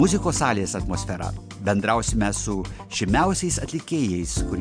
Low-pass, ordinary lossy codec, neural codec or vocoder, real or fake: 9.9 kHz; MP3, 64 kbps; none; real